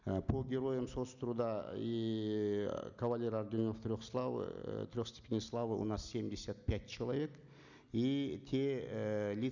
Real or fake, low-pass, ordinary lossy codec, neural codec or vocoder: real; 7.2 kHz; none; none